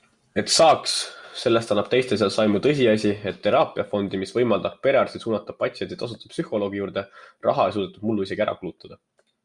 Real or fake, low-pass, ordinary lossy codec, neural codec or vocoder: real; 10.8 kHz; Opus, 64 kbps; none